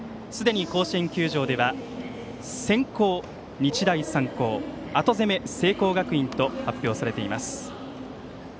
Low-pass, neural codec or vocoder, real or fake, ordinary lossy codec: none; none; real; none